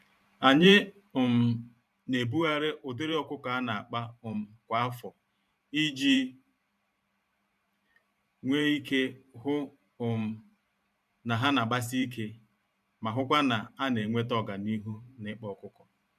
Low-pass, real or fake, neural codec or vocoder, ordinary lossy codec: 14.4 kHz; fake; vocoder, 44.1 kHz, 128 mel bands every 512 samples, BigVGAN v2; none